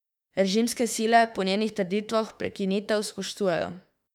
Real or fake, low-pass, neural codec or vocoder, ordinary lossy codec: fake; 19.8 kHz; autoencoder, 48 kHz, 32 numbers a frame, DAC-VAE, trained on Japanese speech; none